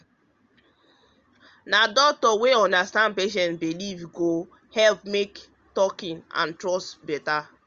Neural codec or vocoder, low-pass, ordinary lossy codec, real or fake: none; 7.2 kHz; Opus, 32 kbps; real